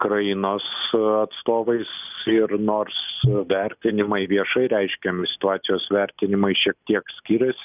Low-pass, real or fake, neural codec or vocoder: 3.6 kHz; real; none